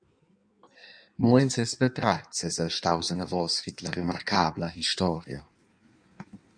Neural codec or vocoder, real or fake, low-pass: codec, 16 kHz in and 24 kHz out, 1.1 kbps, FireRedTTS-2 codec; fake; 9.9 kHz